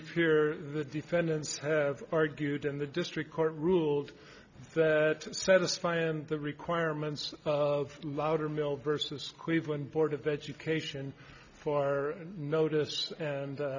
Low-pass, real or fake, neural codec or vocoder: 7.2 kHz; real; none